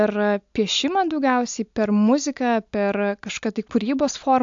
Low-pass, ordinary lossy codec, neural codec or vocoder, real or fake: 7.2 kHz; AAC, 64 kbps; none; real